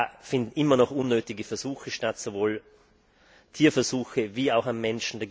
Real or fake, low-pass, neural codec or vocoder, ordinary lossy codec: real; none; none; none